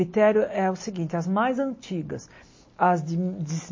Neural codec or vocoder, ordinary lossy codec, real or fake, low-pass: none; MP3, 32 kbps; real; 7.2 kHz